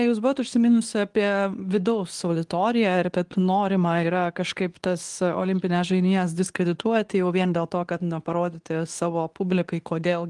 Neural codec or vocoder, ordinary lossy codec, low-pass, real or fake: codec, 24 kHz, 0.9 kbps, WavTokenizer, medium speech release version 2; Opus, 24 kbps; 10.8 kHz; fake